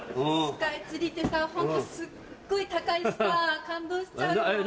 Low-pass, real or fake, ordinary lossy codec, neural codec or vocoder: none; real; none; none